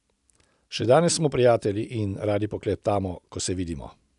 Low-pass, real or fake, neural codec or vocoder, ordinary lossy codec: 10.8 kHz; real; none; none